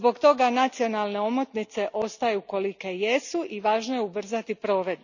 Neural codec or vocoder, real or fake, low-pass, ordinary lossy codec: none; real; 7.2 kHz; none